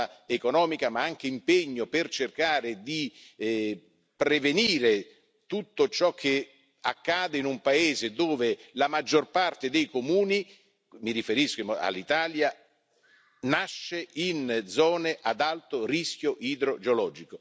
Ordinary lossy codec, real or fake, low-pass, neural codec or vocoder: none; real; none; none